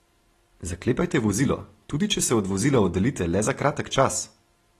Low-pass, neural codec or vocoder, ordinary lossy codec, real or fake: 19.8 kHz; none; AAC, 32 kbps; real